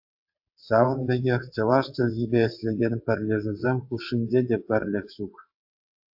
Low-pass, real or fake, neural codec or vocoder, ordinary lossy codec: 5.4 kHz; fake; vocoder, 22.05 kHz, 80 mel bands, WaveNeXt; AAC, 48 kbps